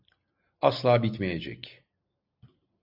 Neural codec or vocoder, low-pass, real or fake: none; 5.4 kHz; real